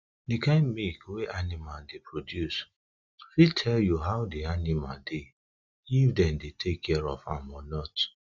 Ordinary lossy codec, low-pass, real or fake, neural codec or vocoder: none; 7.2 kHz; real; none